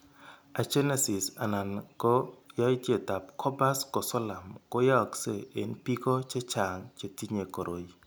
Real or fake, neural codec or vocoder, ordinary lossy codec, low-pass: real; none; none; none